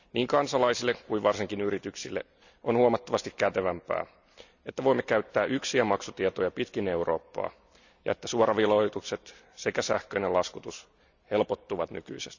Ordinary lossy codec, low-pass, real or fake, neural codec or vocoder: none; 7.2 kHz; real; none